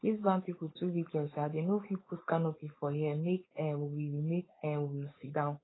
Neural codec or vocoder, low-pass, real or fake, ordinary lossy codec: codec, 16 kHz, 4.8 kbps, FACodec; 7.2 kHz; fake; AAC, 16 kbps